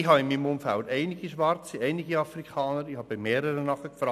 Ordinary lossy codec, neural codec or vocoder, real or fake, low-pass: none; none; real; 14.4 kHz